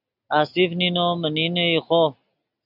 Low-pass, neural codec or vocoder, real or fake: 5.4 kHz; none; real